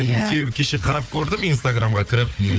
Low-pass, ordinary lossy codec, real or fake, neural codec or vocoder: none; none; fake; codec, 16 kHz, 4 kbps, FunCodec, trained on Chinese and English, 50 frames a second